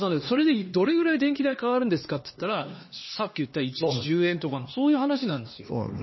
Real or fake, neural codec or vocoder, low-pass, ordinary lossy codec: fake; codec, 16 kHz, 2 kbps, X-Codec, HuBERT features, trained on LibriSpeech; 7.2 kHz; MP3, 24 kbps